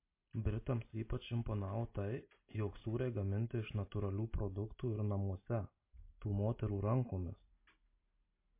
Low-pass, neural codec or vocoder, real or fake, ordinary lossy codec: 3.6 kHz; none; real; MP3, 24 kbps